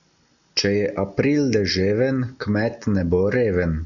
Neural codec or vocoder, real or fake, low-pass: none; real; 7.2 kHz